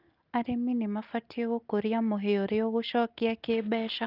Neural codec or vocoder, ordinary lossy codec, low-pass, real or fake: none; Opus, 32 kbps; 5.4 kHz; real